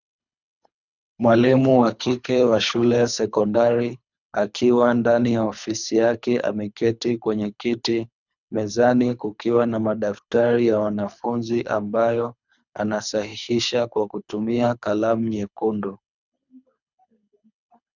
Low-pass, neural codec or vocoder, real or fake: 7.2 kHz; codec, 24 kHz, 3 kbps, HILCodec; fake